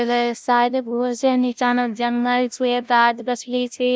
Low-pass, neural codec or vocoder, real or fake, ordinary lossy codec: none; codec, 16 kHz, 0.5 kbps, FunCodec, trained on LibriTTS, 25 frames a second; fake; none